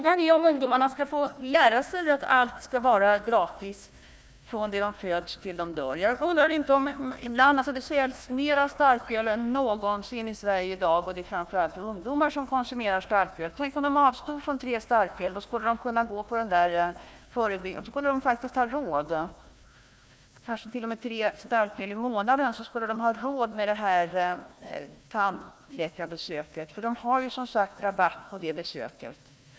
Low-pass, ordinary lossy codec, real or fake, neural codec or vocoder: none; none; fake; codec, 16 kHz, 1 kbps, FunCodec, trained on Chinese and English, 50 frames a second